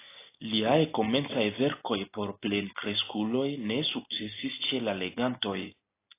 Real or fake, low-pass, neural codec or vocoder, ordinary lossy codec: real; 3.6 kHz; none; AAC, 24 kbps